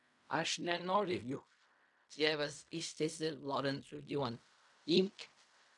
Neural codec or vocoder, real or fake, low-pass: codec, 16 kHz in and 24 kHz out, 0.4 kbps, LongCat-Audio-Codec, fine tuned four codebook decoder; fake; 10.8 kHz